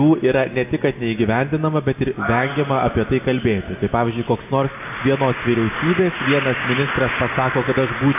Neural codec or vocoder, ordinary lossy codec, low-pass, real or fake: none; AAC, 32 kbps; 3.6 kHz; real